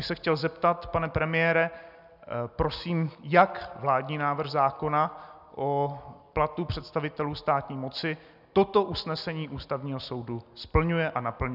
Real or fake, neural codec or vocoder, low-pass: real; none; 5.4 kHz